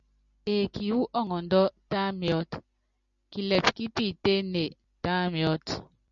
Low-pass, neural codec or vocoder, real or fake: 7.2 kHz; none; real